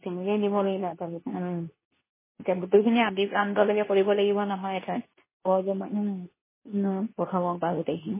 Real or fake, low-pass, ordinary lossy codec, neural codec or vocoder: fake; 3.6 kHz; MP3, 16 kbps; codec, 24 kHz, 0.9 kbps, WavTokenizer, medium speech release version 2